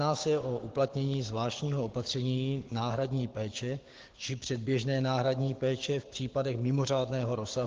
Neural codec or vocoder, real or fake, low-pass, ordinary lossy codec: codec, 16 kHz, 6 kbps, DAC; fake; 7.2 kHz; Opus, 16 kbps